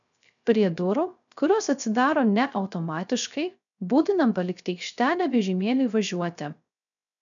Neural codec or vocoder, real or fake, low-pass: codec, 16 kHz, 0.3 kbps, FocalCodec; fake; 7.2 kHz